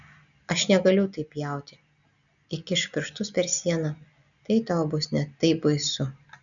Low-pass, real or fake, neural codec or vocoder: 7.2 kHz; real; none